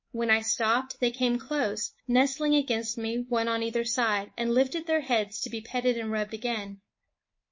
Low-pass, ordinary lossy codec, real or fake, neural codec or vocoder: 7.2 kHz; MP3, 32 kbps; fake; codec, 24 kHz, 3.1 kbps, DualCodec